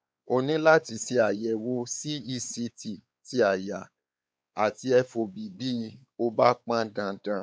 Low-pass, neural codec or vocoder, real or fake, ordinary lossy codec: none; codec, 16 kHz, 4 kbps, X-Codec, WavLM features, trained on Multilingual LibriSpeech; fake; none